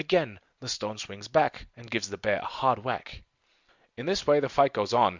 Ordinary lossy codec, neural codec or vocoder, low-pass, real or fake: Opus, 64 kbps; none; 7.2 kHz; real